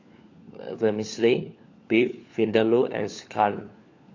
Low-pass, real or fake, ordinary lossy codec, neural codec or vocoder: 7.2 kHz; fake; AAC, 48 kbps; codec, 16 kHz, 4 kbps, FunCodec, trained on LibriTTS, 50 frames a second